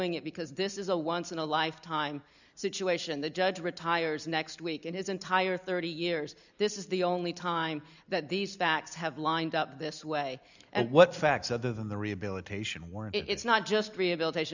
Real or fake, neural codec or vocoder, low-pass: real; none; 7.2 kHz